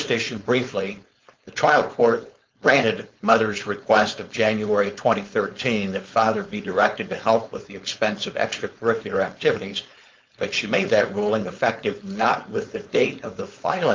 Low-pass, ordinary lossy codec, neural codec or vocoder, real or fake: 7.2 kHz; Opus, 32 kbps; codec, 16 kHz, 4.8 kbps, FACodec; fake